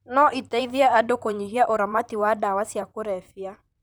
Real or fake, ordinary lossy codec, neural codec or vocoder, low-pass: fake; none; vocoder, 44.1 kHz, 128 mel bands, Pupu-Vocoder; none